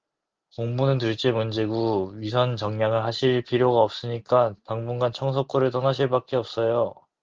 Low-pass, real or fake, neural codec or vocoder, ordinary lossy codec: 7.2 kHz; real; none; Opus, 32 kbps